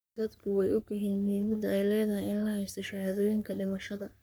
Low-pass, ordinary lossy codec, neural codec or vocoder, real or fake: none; none; codec, 44.1 kHz, 3.4 kbps, Pupu-Codec; fake